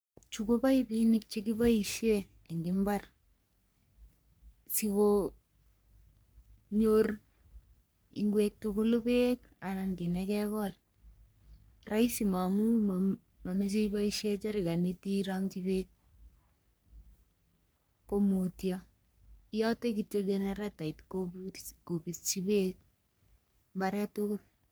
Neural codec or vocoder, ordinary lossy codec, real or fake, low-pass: codec, 44.1 kHz, 3.4 kbps, Pupu-Codec; none; fake; none